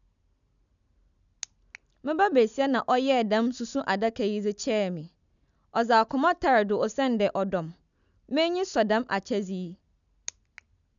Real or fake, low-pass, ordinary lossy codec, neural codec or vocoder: real; 7.2 kHz; none; none